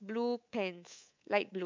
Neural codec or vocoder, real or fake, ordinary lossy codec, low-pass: codec, 24 kHz, 3.1 kbps, DualCodec; fake; MP3, 64 kbps; 7.2 kHz